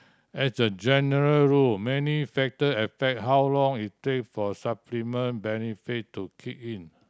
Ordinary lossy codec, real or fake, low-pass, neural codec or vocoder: none; real; none; none